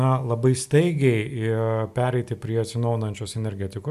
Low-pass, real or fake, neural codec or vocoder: 14.4 kHz; real; none